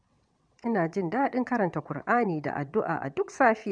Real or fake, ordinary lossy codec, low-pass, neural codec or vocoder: fake; none; 9.9 kHz; vocoder, 22.05 kHz, 80 mel bands, WaveNeXt